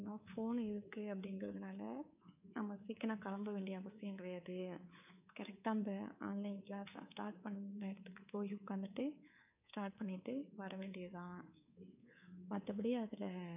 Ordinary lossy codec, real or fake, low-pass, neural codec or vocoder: none; fake; 3.6 kHz; codec, 24 kHz, 3.1 kbps, DualCodec